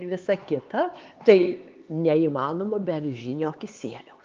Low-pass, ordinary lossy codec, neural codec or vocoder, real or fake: 7.2 kHz; Opus, 32 kbps; codec, 16 kHz, 4 kbps, X-Codec, HuBERT features, trained on LibriSpeech; fake